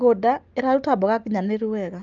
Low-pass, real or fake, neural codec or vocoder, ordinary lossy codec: 7.2 kHz; real; none; Opus, 24 kbps